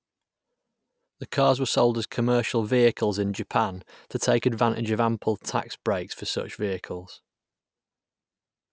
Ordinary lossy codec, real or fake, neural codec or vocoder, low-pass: none; real; none; none